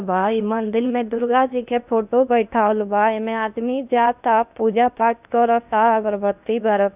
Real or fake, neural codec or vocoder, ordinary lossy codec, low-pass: fake; codec, 16 kHz in and 24 kHz out, 0.8 kbps, FocalCodec, streaming, 65536 codes; none; 3.6 kHz